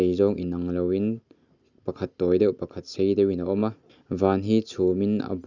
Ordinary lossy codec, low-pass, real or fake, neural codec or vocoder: Opus, 64 kbps; 7.2 kHz; real; none